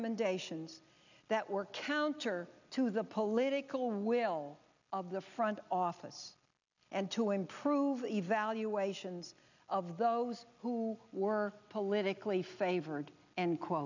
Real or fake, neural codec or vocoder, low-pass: real; none; 7.2 kHz